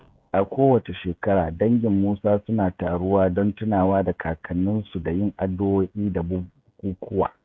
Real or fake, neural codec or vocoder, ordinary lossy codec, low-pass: fake; codec, 16 kHz, 16 kbps, FreqCodec, smaller model; none; none